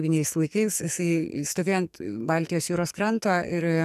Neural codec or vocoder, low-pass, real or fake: codec, 44.1 kHz, 2.6 kbps, SNAC; 14.4 kHz; fake